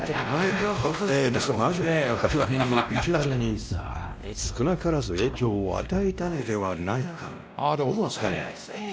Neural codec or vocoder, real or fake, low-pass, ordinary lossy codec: codec, 16 kHz, 1 kbps, X-Codec, WavLM features, trained on Multilingual LibriSpeech; fake; none; none